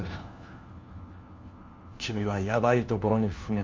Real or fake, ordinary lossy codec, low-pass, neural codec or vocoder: fake; Opus, 32 kbps; 7.2 kHz; codec, 16 kHz, 1 kbps, FunCodec, trained on LibriTTS, 50 frames a second